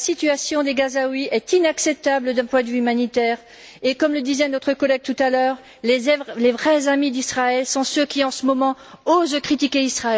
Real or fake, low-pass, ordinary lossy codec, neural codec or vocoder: real; none; none; none